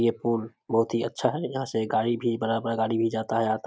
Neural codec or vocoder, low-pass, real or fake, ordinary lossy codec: none; none; real; none